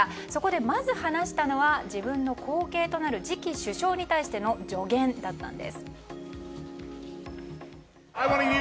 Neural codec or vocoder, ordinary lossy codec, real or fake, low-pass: none; none; real; none